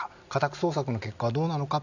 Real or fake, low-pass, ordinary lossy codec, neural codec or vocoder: real; 7.2 kHz; none; none